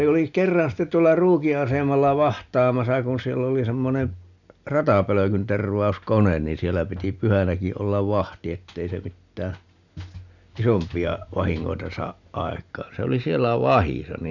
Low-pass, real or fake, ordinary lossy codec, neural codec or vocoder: 7.2 kHz; real; none; none